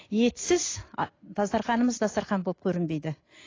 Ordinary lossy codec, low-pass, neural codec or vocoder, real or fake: AAC, 32 kbps; 7.2 kHz; none; real